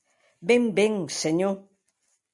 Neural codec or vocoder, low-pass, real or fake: none; 10.8 kHz; real